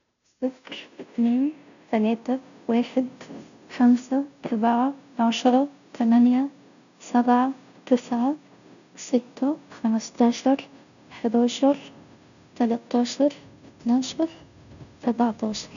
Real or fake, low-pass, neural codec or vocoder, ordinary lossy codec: fake; 7.2 kHz; codec, 16 kHz, 0.5 kbps, FunCodec, trained on Chinese and English, 25 frames a second; MP3, 96 kbps